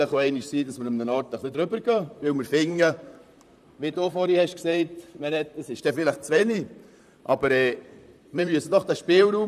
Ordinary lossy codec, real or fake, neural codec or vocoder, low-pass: none; fake; vocoder, 44.1 kHz, 128 mel bands, Pupu-Vocoder; 14.4 kHz